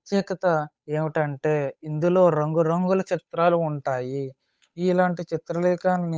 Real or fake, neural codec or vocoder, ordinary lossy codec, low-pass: fake; codec, 16 kHz, 8 kbps, FunCodec, trained on Chinese and English, 25 frames a second; none; none